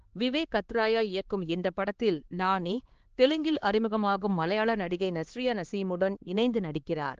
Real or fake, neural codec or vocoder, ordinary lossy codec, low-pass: fake; codec, 16 kHz, 2 kbps, X-Codec, HuBERT features, trained on LibriSpeech; Opus, 16 kbps; 7.2 kHz